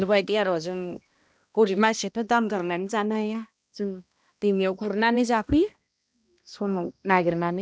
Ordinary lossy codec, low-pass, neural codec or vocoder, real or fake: none; none; codec, 16 kHz, 1 kbps, X-Codec, HuBERT features, trained on balanced general audio; fake